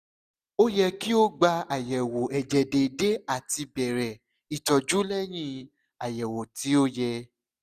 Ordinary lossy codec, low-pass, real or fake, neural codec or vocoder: none; 14.4 kHz; real; none